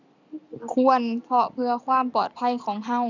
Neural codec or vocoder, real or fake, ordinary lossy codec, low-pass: none; real; none; 7.2 kHz